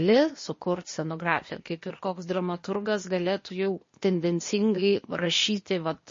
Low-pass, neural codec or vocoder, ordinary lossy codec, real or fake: 7.2 kHz; codec, 16 kHz, 0.8 kbps, ZipCodec; MP3, 32 kbps; fake